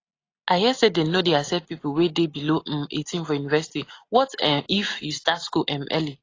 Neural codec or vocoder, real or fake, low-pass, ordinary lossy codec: none; real; 7.2 kHz; AAC, 32 kbps